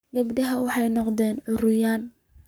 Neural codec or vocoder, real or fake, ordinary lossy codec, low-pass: codec, 44.1 kHz, 7.8 kbps, Pupu-Codec; fake; none; none